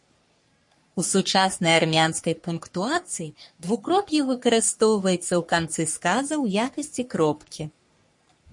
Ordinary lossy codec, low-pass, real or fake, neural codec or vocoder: MP3, 48 kbps; 10.8 kHz; fake; codec, 44.1 kHz, 3.4 kbps, Pupu-Codec